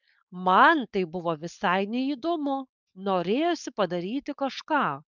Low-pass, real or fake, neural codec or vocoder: 7.2 kHz; fake; codec, 16 kHz, 4.8 kbps, FACodec